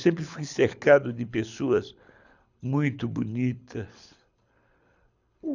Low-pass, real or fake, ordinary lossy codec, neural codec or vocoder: 7.2 kHz; fake; none; codec, 24 kHz, 6 kbps, HILCodec